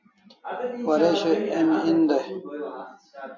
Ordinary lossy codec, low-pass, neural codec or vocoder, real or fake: AAC, 48 kbps; 7.2 kHz; none; real